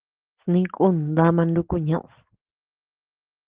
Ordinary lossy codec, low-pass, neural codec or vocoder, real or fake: Opus, 16 kbps; 3.6 kHz; none; real